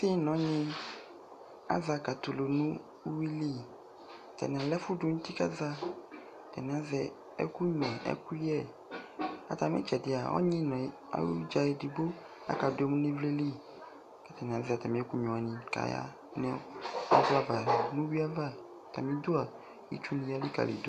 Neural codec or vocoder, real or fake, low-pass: none; real; 14.4 kHz